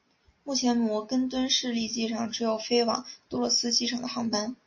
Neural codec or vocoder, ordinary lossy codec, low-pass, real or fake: none; MP3, 32 kbps; 7.2 kHz; real